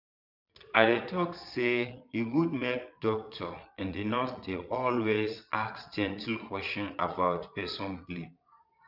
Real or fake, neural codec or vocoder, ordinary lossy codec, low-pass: fake; vocoder, 22.05 kHz, 80 mel bands, Vocos; none; 5.4 kHz